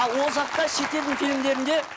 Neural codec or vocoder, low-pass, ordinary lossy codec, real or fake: none; none; none; real